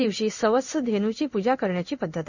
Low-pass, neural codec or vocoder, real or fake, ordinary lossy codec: 7.2 kHz; codec, 16 kHz in and 24 kHz out, 1 kbps, XY-Tokenizer; fake; none